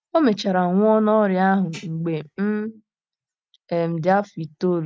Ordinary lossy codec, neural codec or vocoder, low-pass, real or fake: none; none; none; real